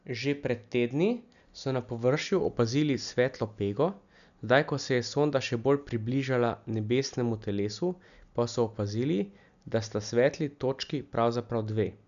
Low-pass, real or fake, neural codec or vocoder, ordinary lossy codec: 7.2 kHz; real; none; none